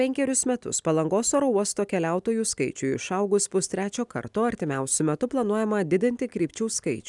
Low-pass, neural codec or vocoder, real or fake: 10.8 kHz; none; real